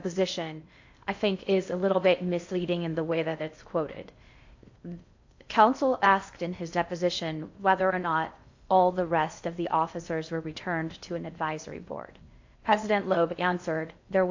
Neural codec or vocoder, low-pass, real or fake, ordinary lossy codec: codec, 16 kHz in and 24 kHz out, 0.6 kbps, FocalCodec, streaming, 2048 codes; 7.2 kHz; fake; AAC, 48 kbps